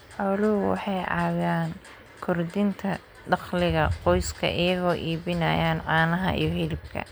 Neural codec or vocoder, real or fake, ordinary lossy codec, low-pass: none; real; none; none